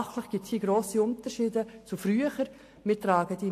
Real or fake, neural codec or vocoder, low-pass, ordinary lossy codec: real; none; 14.4 kHz; AAC, 64 kbps